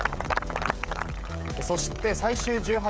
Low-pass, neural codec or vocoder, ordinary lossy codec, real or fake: none; codec, 16 kHz, 16 kbps, FreqCodec, smaller model; none; fake